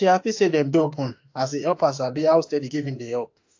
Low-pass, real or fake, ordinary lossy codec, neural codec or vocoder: 7.2 kHz; fake; AAC, 48 kbps; autoencoder, 48 kHz, 32 numbers a frame, DAC-VAE, trained on Japanese speech